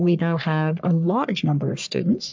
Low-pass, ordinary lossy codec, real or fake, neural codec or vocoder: 7.2 kHz; MP3, 64 kbps; fake; codec, 44.1 kHz, 3.4 kbps, Pupu-Codec